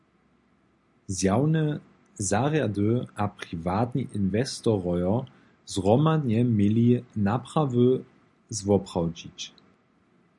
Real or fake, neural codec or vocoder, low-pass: real; none; 10.8 kHz